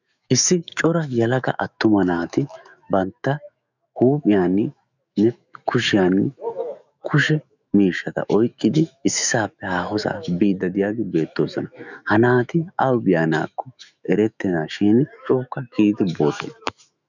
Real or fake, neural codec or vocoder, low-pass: fake; autoencoder, 48 kHz, 128 numbers a frame, DAC-VAE, trained on Japanese speech; 7.2 kHz